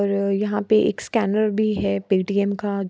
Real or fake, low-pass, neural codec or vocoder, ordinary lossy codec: real; none; none; none